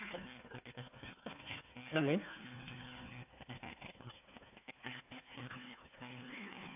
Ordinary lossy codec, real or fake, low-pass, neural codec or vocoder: AAC, 32 kbps; fake; 3.6 kHz; codec, 16 kHz, 1 kbps, FunCodec, trained on Chinese and English, 50 frames a second